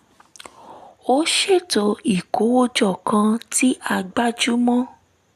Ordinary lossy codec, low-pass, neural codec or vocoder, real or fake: none; 14.4 kHz; none; real